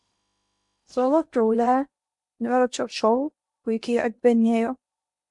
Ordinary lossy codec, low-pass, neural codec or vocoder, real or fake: MP3, 64 kbps; 10.8 kHz; codec, 16 kHz in and 24 kHz out, 0.8 kbps, FocalCodec, streaming, 65536 codes; fake